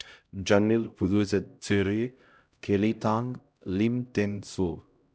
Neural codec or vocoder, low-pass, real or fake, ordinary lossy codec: codec, 16 kHz, 0.5 kbps, X-Codec, HuBERT features, trained on LibriSpeech; none; fake; none